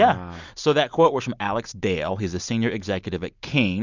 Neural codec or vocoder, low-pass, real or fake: none; 7.2 kHz; real